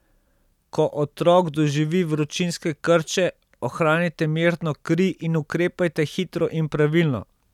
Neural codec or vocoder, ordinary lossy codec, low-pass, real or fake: none; none; 19.8 kHz; real